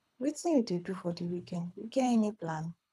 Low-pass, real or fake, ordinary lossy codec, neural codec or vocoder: none; fake; none; codec, 24 kHz, 3 kbps, HILCodec